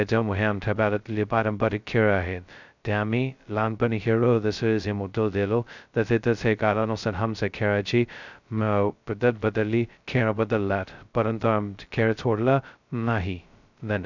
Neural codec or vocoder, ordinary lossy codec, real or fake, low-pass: codec, 16 kHz, 0.2 kbps, FocalCodec; none; fake; 7.2 kHz